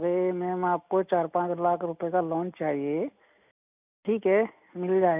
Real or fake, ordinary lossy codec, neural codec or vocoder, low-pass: real; AAC, 32 kbps; none; 3.6 kHz